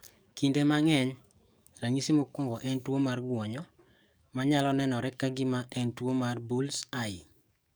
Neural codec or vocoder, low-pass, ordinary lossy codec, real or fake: codec, 44.1 kHz, 7.8 kbps, DAC; none; none; fake